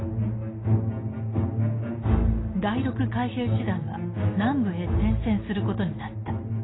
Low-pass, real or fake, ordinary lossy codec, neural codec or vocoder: 7.2 kHz; real; AAC, 16 kbps; none